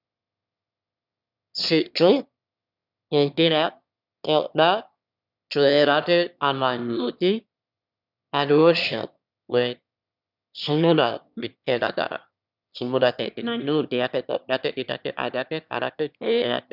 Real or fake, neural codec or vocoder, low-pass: fake; autoencoder, 22.05 kHz, a latent of 192 numbers a frame, VITS, trained on one speaker; 5.4 kHz